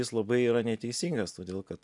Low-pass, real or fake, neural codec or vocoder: 10.8 kHz; real; none